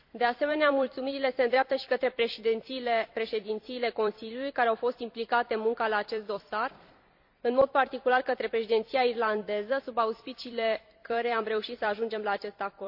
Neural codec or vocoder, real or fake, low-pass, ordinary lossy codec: vocoder, 44.1 kHz, 128 mel bands every 256 samples, BigVGAN v2; fake; 5.4 kHz; AAC, 48 kbps